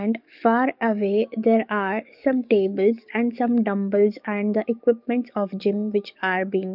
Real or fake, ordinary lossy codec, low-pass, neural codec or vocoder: fake; none; 5.4 kHz; codec, 44.1 kHz, 7.8 kbps, DAC